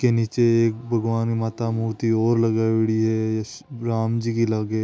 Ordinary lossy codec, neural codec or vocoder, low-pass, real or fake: none; none; none; real